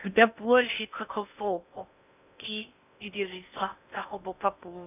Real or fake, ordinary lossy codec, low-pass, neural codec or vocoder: fake; none; 3.6 kHz; codec, 16 kHz in and 24 kHz out, 0.6 kbps, FocalCodec, streaming, 4096 codes